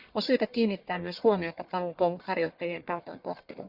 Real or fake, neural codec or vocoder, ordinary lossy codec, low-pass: fake; codec, 44.1 kHz, 1.7 kbps, Pupu-Codec; Opus, 64 kbps; 5.4 kHz